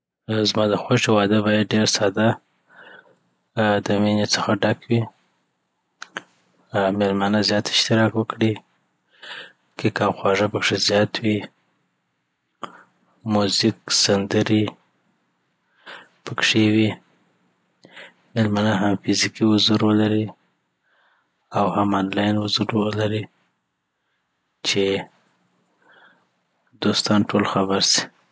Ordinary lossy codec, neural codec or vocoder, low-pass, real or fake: none; none; none; real